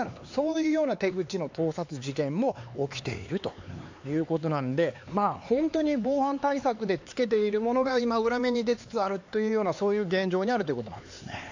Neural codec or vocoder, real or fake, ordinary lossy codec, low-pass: codec, 16 kHz, 4 kbps, X-Codec, HuBERT features, trained on LibriSpeech; fake; AAC, 48 kbps; 7.2 kHz